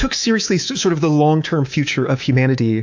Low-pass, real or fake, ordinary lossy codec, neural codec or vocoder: 7.2 kHz; fake; AAC, 48 kbps; codec, 24 kHz, 3.1 kbps, DualCodec